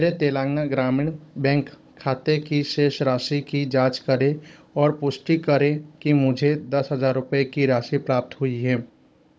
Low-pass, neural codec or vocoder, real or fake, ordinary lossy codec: none; codec, 16 kHz, 16 kbps, FunCodec, trained on Chinese and English, 50 frames a second; fake; none